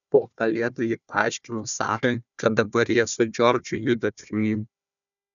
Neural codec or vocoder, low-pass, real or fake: codec, 16 kHz, 1 kbps, FunCodec, trained on Chinese and English, 50 frames a second; 7.2 kHz; fake